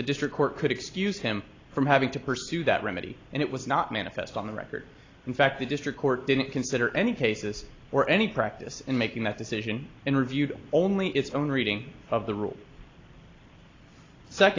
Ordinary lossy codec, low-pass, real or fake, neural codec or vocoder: AAC, 32 kbps; 7.2 kHz; real; none